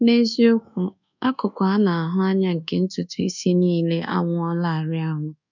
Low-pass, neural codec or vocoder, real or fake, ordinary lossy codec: 7.2 kHz; codec, 24 kHz, 1.2 kbps, DualCodec; fake; none